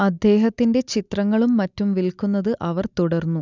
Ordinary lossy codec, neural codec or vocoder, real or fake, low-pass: none; none; real; 7.2 kHz